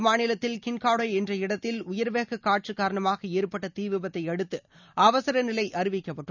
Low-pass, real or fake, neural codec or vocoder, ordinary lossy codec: 7.2 kHz; real; none; none